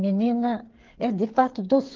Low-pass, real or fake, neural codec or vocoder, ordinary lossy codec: 7.2 kHz; fake; codec, 16 kHz, 8 kbps, FreqCodec, smaller model; Opus, 16 kbps